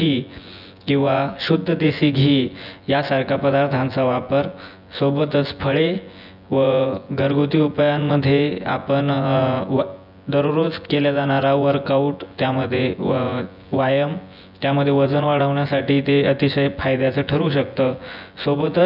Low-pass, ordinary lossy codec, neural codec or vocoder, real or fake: 5.4 kHz; none; vocoder, 24 kHz, 100 mel bands, Vocos; fake